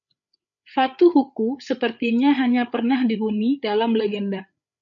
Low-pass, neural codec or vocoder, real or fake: 7.2 kHz; codec, 16 kHz, 8 kbps, FreqCodec, larger model; fake